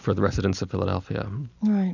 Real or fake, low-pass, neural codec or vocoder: real; 7.2 kHz; none